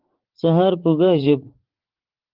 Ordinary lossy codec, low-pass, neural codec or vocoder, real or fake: Opus, 16 kbps; 5.4 kHz; vocoder, 44.1 kHz, 80 mel bands, Vocos; fake